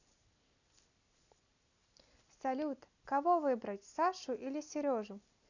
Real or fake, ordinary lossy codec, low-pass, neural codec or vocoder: real; none; 7.2 kHz; none